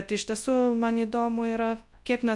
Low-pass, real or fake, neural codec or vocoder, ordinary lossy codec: 10.8 kHz; fake; codec, 24 kHz, 0.9 kbps, WavTokenizer, large speech release; MP3, 64 kbps